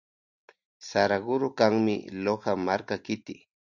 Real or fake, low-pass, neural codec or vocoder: real; 7.2 kHz; none